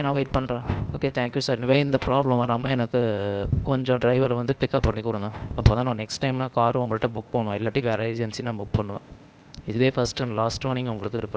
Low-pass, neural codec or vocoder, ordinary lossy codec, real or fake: none; codec, 16 kHz, 0.8 kbps, ZipCodec; none; fake